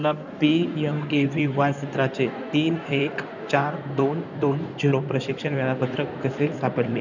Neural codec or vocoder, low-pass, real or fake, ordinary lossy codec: codec, 16 kHz in and 24 kHz out, 2.2 kbps, FireRedTTS-2 codec; 7.2 kHz; fake; none